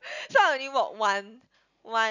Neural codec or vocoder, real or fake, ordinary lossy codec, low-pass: none; real; none; 7.2 kHz